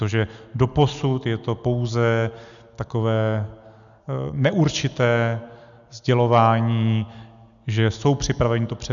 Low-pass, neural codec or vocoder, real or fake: 7.2 kHz; none; real